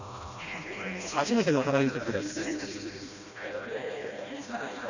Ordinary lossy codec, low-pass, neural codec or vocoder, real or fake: none; 7.2 kHz; codec, 16 kHz, 1 kbps, FreqCodec, smaller model; fake